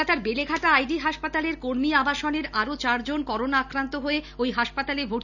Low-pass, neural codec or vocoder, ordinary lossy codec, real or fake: 7.2 kHz; none; none; real